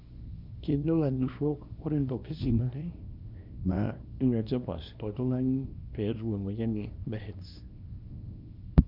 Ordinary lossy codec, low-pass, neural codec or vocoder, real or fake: none; 5.4 kHz; codec, 24 kHz, 0.9 kbps, WavTokenizer, small release; fake